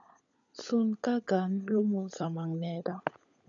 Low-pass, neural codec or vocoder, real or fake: 7.2 kHz; codec, 16 kHz, 16 kbps, FunCodec, trained on LibriTTS, 50 frames a second; fake